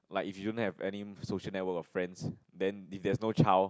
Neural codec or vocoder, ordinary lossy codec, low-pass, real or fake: none; none; none; real